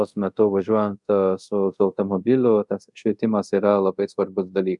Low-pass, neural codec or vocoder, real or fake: 10.8 kHz; codec, 24 kHz, 0.5 kbps, DualCodec; fake